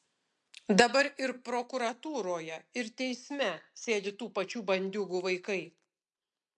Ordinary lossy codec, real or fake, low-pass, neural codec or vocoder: MP3, 64 kbps; real; 10.8 kHz; none